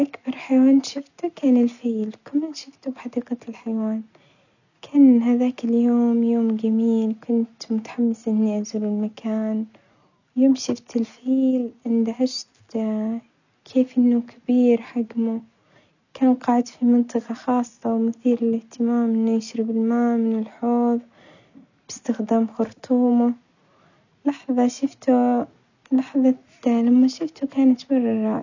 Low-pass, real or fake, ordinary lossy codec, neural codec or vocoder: 7.2 kHz; real; none; none